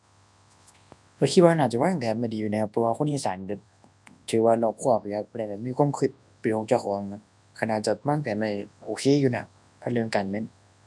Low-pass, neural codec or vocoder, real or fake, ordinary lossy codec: none; codec, 24 kHz, 0.9 kbps, WavTokenizer, large speech release; fake; none